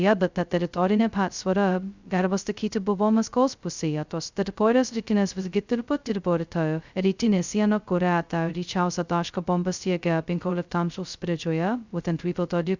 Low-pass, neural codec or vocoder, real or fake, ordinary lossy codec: 7.2 kHz; codec, 16 kHz, 0.2 kbps, FocalCodec; fake; Opus, 64 kbps